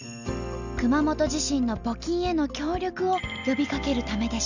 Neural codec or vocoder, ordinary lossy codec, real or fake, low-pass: none; none; real; 7.2 kHz